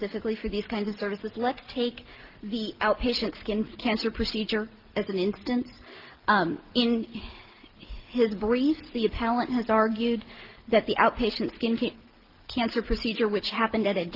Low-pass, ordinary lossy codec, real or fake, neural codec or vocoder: 5.4 kHz; Opus, 16 kbps; real; none